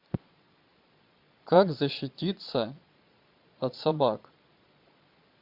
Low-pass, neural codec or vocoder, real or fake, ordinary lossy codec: 5.4 kHz; vocoder, 22.05 kHz, 80 mel bands, Vocos; fake; MP3, 48 kbps